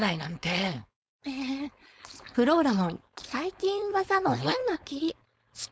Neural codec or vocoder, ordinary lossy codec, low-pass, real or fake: codec, 16 kHz, 4.8 kbps, FACodec; none; none; fake